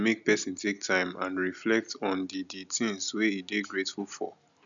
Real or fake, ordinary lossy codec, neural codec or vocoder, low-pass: real; none; none; 7.2 kHz